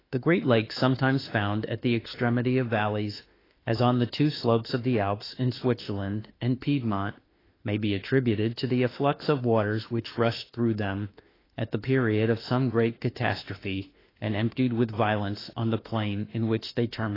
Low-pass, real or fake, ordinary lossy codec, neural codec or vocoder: 5.4 kHz; fake; AAC, 24 kbps; autoencoder, 48 kHz, 32 numbers a frame, DAC-VAE, trained on Japanese speech